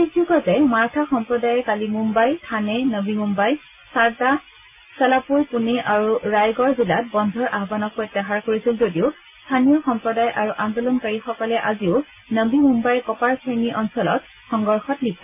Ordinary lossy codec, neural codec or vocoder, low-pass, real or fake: MP3, 24 kbps; none; 3.6 kHz; real